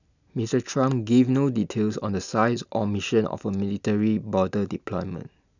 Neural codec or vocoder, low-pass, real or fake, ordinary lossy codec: none; 7.2 kHz; real; none